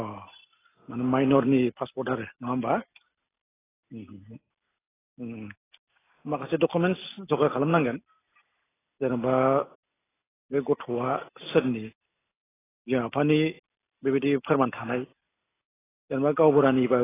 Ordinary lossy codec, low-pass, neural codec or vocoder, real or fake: AAC, 16 kbps; 3.6 kHz; none; real